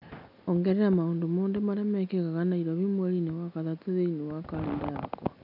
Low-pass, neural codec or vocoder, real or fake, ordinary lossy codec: 5.4 kHz; none; real; none